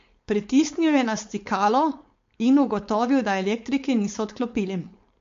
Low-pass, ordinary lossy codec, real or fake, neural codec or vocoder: 7.2 kHz; MP3, 48 kbps; fake; codec, 16 kHz, 4.8 kbps, FACodec